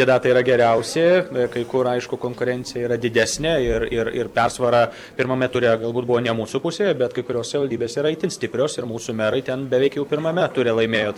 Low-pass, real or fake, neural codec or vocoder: 19.8 kHz; fake; vocoder, 44.1 kHz, 128 mel bands every 256 samples, BigVGAN v2